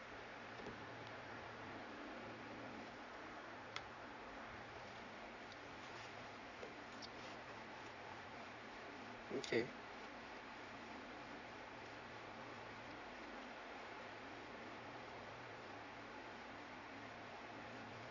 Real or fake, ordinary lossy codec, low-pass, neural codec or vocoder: fake; none; 7.2 kHz; vocoder, 44.1 kHz, 80 mel bands, Vocos